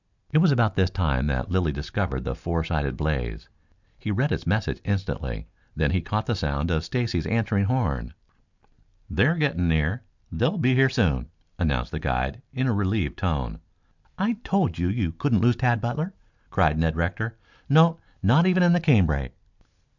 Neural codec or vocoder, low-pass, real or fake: none; 7.2 kHz; real